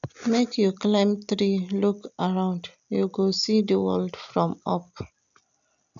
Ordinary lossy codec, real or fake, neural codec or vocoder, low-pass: none; real; none; 7.2 kHz